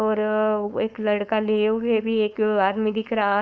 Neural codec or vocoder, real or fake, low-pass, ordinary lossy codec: codec, 16 kHz, 4.8 kbps, FACodec; fake; none; none